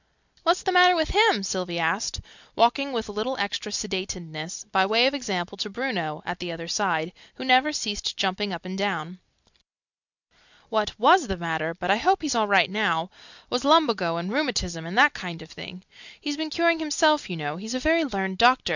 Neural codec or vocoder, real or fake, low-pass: none; real; 7.2 kHz